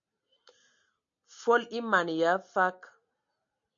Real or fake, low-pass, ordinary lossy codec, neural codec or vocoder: real; 7.2 kHz; MP3, 64 kbps; none